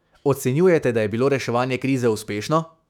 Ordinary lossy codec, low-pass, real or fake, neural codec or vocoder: none; 19.8 kHz; fake; autoencoder, 48 kHz, 128 numbers a frame, DAC-VAE, trained on Japanese speech